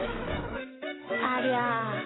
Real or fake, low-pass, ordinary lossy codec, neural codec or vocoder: fake; 7.2 kHz; AAC, 16 kbps; autoencoder, 48 kHz, 128 numbers a frame, DAC-VAE, trained on Japanese speech